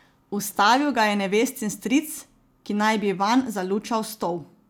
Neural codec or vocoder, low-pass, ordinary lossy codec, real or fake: none; none; none; real